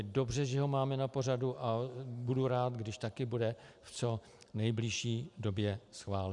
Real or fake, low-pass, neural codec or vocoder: real; 10.8 kHz; none